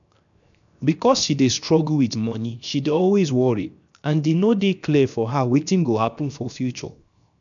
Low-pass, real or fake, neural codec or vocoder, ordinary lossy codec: 7.2 kHz; fake; codec, 16 kHz, 0.7 kbps, FocalCodec; none